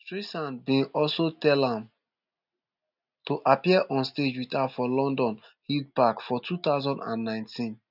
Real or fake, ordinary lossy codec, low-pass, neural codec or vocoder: real; none; 5.4 kHz; none